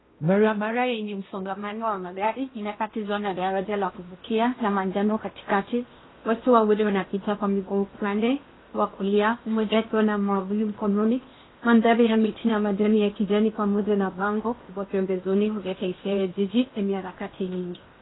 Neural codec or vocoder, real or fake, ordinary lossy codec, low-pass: codec, 16 kHz in and 24 kHz out, 0.8 kbps, FocalCodec, streaming, 65536 codes; fake; AAC, 16 kbps; 7.2 kHz